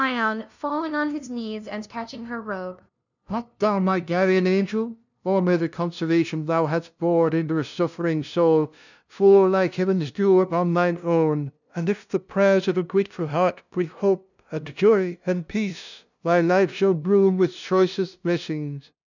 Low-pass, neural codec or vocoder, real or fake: 7.2 kHz; codec, 16 kHz, 0.5 kbps, FunCodec, trained on LibriTTS, 25 frames a second; fake